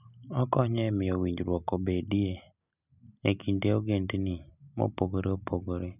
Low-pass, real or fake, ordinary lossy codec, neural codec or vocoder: 3.6 kHz; real; none; none